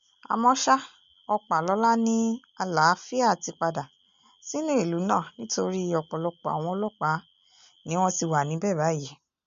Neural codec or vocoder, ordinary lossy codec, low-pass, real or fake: none; MP3, 64 kbps; 7.2 kHz; real